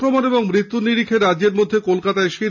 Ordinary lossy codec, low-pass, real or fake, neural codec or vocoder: none; 7.2 kHz; real; none